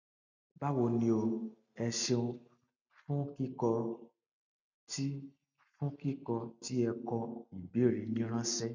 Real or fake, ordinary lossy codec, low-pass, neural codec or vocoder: real; none; 7.2 kHz; none